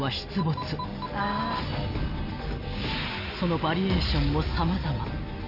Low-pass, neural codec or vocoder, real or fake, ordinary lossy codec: 5.4 kHz; none; real; AAC, 32 kbps